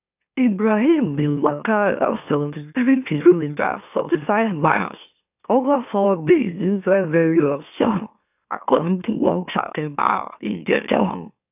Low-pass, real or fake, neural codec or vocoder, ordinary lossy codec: 3.6 kHz; fake; autoencoder, 44.1 kHz, a latent of 192 numbers a frame, MeloTTS; none